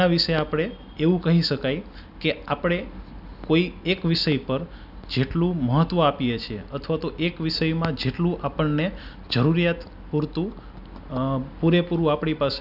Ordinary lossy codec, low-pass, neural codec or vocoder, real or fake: none; 5.4 kHz; none; real